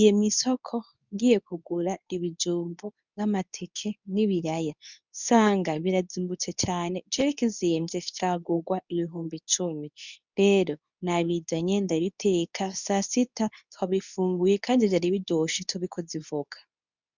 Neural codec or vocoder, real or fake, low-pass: codec, 24 kHz, 0.9 kbps, WavTokenizer, medium speech release version 2; fake; 7.2 kHz